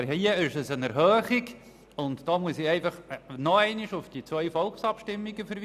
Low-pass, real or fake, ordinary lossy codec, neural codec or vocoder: 14.4 kHz; real; none; none